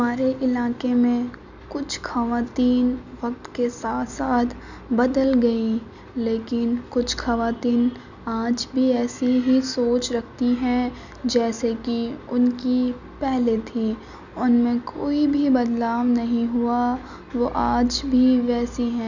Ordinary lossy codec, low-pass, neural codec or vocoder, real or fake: none; 7.2 kHz; none; real